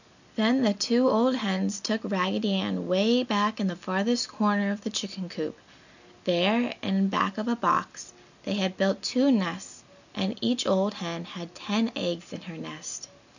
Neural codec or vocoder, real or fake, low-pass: none; real; 7.2 kHz